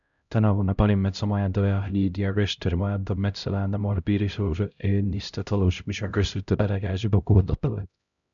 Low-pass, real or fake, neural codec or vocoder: 7.2 kHz; fake; codec, 16 kHz, 0.5 kbps, X-Codec, HuBERT features, trained on LibriSpeech